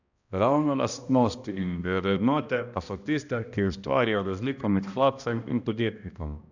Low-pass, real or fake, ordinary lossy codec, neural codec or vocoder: 7.2 kHz; fake; none; codec, 16 kHz, 1 kbps, X-Codec, HuBERT features, trained on balanced general audio